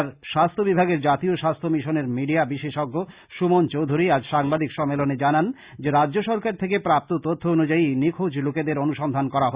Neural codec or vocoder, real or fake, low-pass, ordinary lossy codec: none; real; 3.6 kHz; Opus, 64 kbps